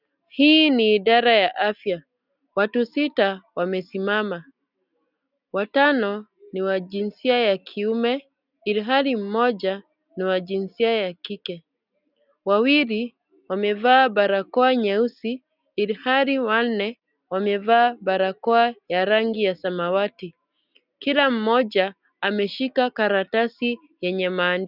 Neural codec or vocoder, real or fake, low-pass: none; real; 5.4 kHz